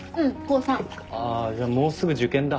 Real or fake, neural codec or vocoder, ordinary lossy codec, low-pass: real; none; none; none